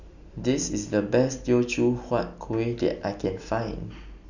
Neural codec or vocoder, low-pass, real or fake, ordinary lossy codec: none; 7.2 kHz; real; none